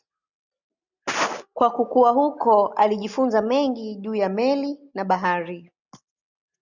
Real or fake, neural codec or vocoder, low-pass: real; none; 7.2 kHz